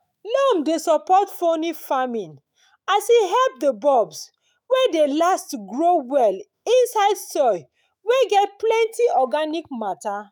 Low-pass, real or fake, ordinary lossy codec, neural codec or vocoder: none; fake; none; autoencoder, 48 kHz, 128 numbers a frame, DAC-VAE, trained on Japanese speech